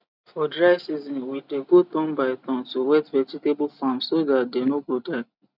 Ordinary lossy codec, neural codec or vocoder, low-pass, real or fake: none; none; 5.4 kHz; real